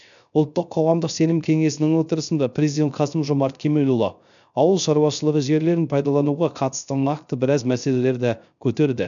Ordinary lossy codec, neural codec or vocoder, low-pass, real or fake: none; codec, 16 kHz, 0.3 kbps, FocalCodec; 7.2 kHz; fake